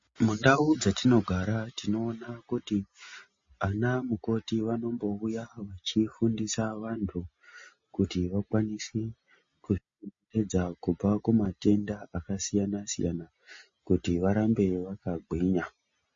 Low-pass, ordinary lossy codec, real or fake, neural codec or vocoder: 7.2 kHz; MP3, 32 kbps; real; none